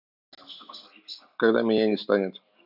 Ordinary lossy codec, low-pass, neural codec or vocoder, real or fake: none; 5.4 kHz; none; real